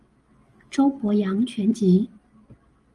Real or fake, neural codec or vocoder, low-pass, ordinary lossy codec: real; none; 10.8 kHz; Opus, 24 kbps